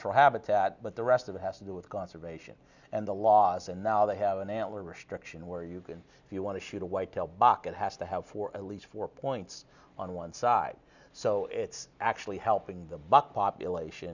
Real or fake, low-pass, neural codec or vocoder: real; 7.2 kHz; none